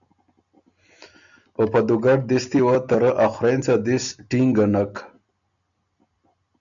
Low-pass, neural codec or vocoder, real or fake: 7.2 kHz; none; real